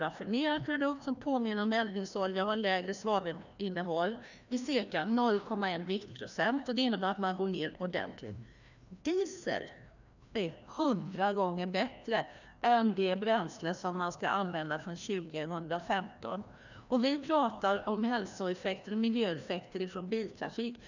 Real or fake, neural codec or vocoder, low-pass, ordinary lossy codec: fake; codec, 16 kHz, 1 kbps, FreqCodec, larger model; 7.2 kHz; none